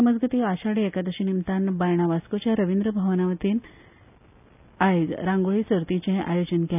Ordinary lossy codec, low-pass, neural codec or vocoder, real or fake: none; 3.6 kHz; none; real